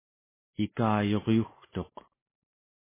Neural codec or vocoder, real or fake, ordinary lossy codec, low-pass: none; real; MP3, 16 kbps; 3.6 kHz